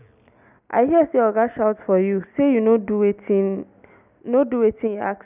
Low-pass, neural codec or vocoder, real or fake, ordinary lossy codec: 3.6 kHz; none; real; none